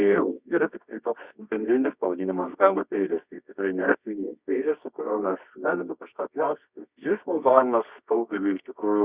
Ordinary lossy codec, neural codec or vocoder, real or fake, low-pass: Opus, 64 kbps; codec, 24 kHz, 0.9 kbps, WavTokenizer, medium music audio release; fake; 3.6 kHz